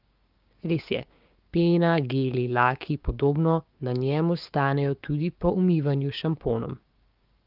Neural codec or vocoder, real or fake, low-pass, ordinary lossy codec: codec, 16 kHz, 6 kbps, DAC; fake; 5.4 kHz; Opus, 32 kbps